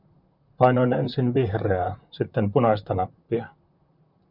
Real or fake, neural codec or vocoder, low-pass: fake; vocoder, 44.1 kHz, 128 mel bands, Pupu-Vocoder; 5.4 kHz